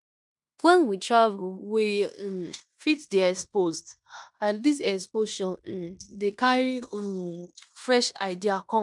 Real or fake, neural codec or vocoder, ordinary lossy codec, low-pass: fake; codec, 16 kHz in and 24 kHz out, 0.9 kbps, LongCat-Audio-Codec, fine tuned four codebook decoder; MP3, 96 kbps; 10.8 kHz